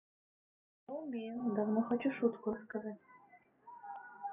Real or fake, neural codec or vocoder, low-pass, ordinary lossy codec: real; none; 3.6 kHz; none